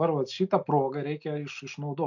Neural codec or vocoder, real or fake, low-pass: none; real; 7.2 kHz